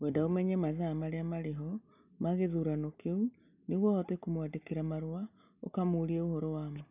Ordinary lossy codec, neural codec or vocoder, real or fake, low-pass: none; none; real; 3.6 kHz